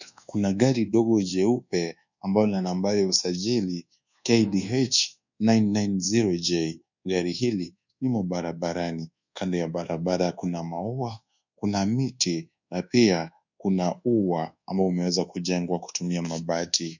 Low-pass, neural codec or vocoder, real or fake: 7.2 kHz; codec, 24 kHz, 1.2 kbps, DualCodec; fake